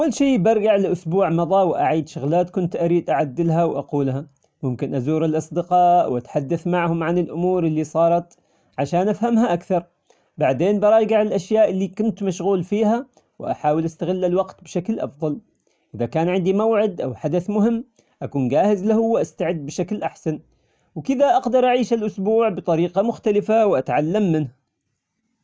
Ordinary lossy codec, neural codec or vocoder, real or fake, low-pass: none; none; real; none